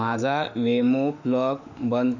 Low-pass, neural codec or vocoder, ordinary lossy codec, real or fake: 7.2 kHz; autoencoder, 48 kHz, 32 numbers a frame, DAC-VAE, trained on Japanese speech; none; fake